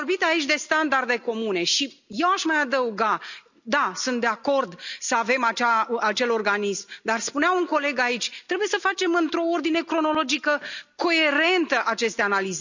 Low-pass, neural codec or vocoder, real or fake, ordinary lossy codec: 7.2 kHz; none; real; none